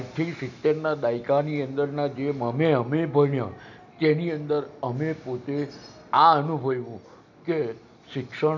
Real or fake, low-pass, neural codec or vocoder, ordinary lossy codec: real; 7.2 kHz; none; none